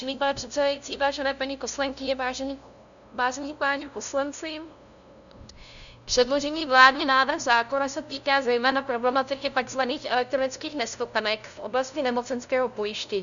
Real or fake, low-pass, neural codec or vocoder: fake; 7.2 kHz; codec, 16 kHz, 0.5 kbps, FunCodec, trained on LibriTTS, 25 frames a second